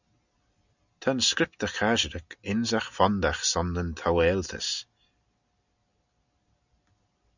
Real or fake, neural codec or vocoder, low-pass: real; none; 7.2 kHz